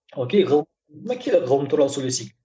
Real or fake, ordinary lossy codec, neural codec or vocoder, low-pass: real; none; none; none